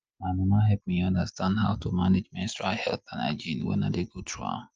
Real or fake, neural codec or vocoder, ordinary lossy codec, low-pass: real; none; Opus, 24 kbps; 7.2 kHz